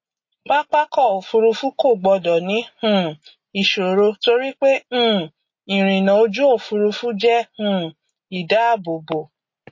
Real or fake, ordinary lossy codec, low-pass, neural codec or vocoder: real; MP3, 32 kbps; 7.2 kHz; none